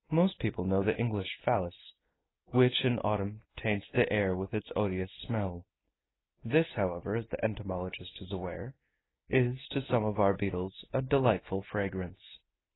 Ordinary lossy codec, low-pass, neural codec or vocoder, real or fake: AAC, 16 kbps; 7.2 kHz; none; real